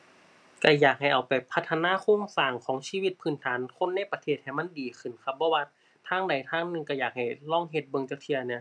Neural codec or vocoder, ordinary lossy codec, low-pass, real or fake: none; none; none; real